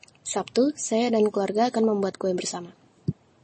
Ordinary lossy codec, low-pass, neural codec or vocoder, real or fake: MP3, 32 kbps; 10.8 kHz; none; real